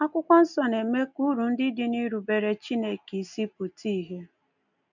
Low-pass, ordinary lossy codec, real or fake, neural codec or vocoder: 7.2 kHz; none; real; none